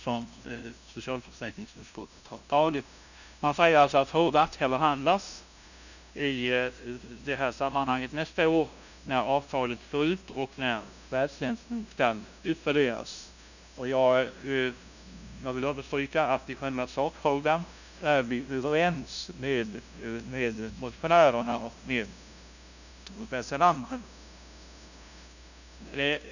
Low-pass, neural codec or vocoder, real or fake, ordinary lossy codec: 7.2 kHz; codec, 16 kHz, 0.5 kbps, FunCodec, trained on LibriTTS, 25 frames a second; fake; none